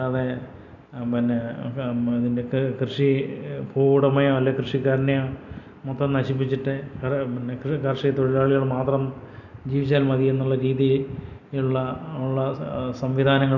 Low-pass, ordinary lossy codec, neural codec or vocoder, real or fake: 7.2 kHz; none; none; real